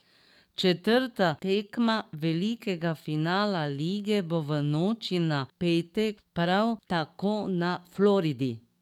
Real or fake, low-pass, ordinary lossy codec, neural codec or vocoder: fake; 19.8 kHz; none; codec, 44.1 kHz, 7.8 kbps, DAC